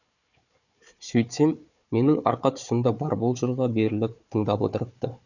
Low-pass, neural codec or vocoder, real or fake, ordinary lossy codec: 7.2 kHz; codec, 16 kHz, 4 kbps, FunCodec, trained on Chinese and English, 50 frames a second; fake; none